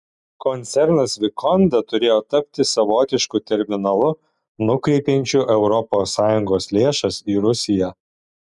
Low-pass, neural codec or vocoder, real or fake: 10.8 kHz; none; real